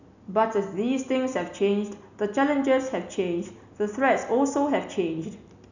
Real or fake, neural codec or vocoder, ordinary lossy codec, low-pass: real; none; none; 7.2 kHz